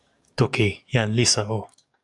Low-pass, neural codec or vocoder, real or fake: 10.8 kHz; codec, 44.1 kHz, 7.8 kbps, DAC; fake